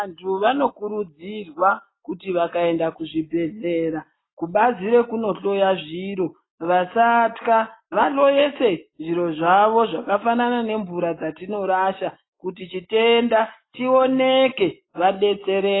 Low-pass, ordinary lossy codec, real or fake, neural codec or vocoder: 7.2 kHz; AAC, 16 kbps; real; none